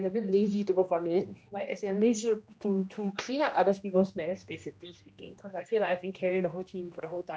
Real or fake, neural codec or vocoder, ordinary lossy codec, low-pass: fake; codec, 16 kHz, 1 kbps, X-Codec, HuBERT features, trained on general audio; none; none